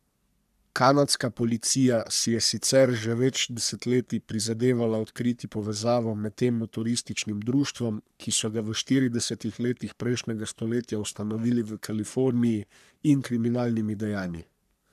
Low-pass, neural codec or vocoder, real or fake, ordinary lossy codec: 14.4 kHz; codec, 44.1 kHz, 3.4 kbps, Pupu-Codec; fake; none